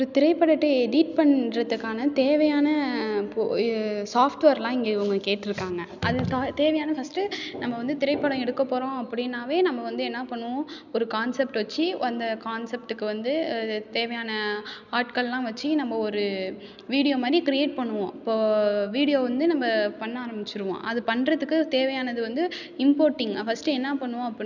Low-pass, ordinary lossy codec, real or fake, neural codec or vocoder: 7.2 kHz; none; real; none